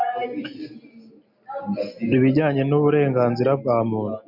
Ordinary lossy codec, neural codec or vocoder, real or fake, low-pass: MP3, 48 kbps; none; real; 5.4 kHz